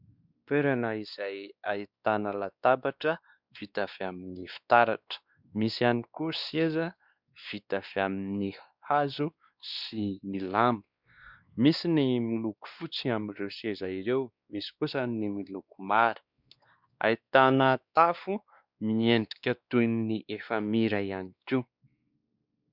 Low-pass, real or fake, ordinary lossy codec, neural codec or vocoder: 5.4 kHz; fake; Opus, 64 kbps; codec, 16 kHz, 2 kbps, X-Codec, WavLM features, trained on Multilingual LibriSpeech